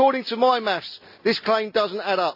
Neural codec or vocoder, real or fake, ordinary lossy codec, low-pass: none; real; AAC, 48 kbps; 5.4 kHz